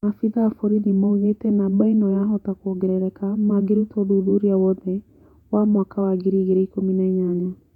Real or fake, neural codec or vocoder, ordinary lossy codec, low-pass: fake; vocoder, 48 kHz, 128 mel bands, Vocos; none; 19.8 kHz